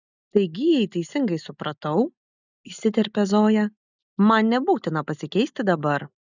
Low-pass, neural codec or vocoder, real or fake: 7.2 kHz; none; real